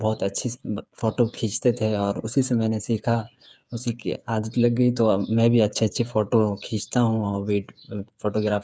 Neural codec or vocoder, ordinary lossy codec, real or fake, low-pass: codec, 16 kHz, 8 kbps, FreqCodec, smaller model; none; fake; none